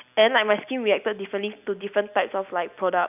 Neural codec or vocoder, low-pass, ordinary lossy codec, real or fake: none; 3.6 kHz; none; real